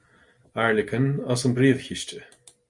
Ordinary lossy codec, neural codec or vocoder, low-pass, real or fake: Opus, 64 kbps; none; 10.8 kHz; real